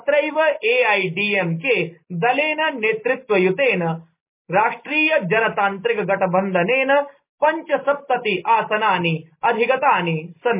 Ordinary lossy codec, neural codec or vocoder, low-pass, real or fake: MP3, 24 kbps; none; 3.6 kHz; real